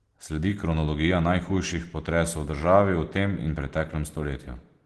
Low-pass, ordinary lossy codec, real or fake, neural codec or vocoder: 10.8 kHz; Opus, 16 kbps; real; none